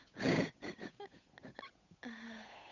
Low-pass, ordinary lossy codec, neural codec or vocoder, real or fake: 7.2 kHz; none; codec, 16 kHz, 8 kbps, FunCodec, trained on Chinese and English, 25 frames a second; fake